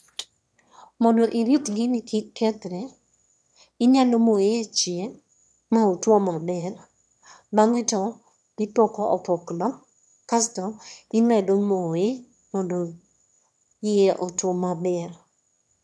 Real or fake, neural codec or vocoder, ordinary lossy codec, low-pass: fake; autoencoder, 22.05 kHz, a latent of 192 numbers a frame, VITS, trained on one speaker; none; none